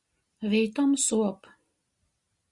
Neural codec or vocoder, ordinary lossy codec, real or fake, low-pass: none; Opus, 64 kbps; real; 10.8 kHz